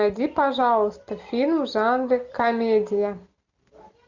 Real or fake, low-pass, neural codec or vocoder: real; 7.2 kHz; none